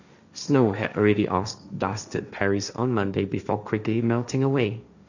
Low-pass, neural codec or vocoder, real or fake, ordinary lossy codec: 7.2 kHz; codec, 16 kHz, 1.1 kbps, Voila-Tokenizer; fake; none